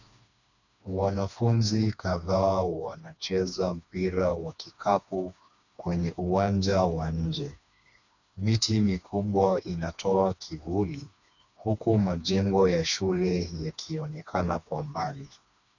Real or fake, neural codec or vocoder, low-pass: fake; codec, 16 kHz, 2 kbps, FreqCodec, smaller model; 7.2 kHz